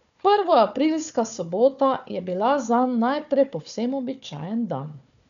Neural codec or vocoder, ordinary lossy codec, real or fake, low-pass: codec, 16 kHz, 4 kbps, FunCodec, trained on Chinese and English, 50 frames a second; none; fake; 7.2 kHz